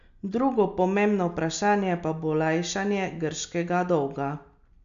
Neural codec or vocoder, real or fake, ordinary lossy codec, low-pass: none; real; AAC, 96 kbps; 7.2 kHz